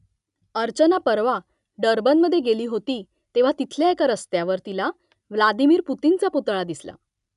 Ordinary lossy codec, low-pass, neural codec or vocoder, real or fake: none; 10.8 kHz; none; real